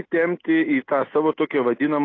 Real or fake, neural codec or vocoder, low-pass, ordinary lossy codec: real; none; 7.2 kHz; AAC, 32 kbps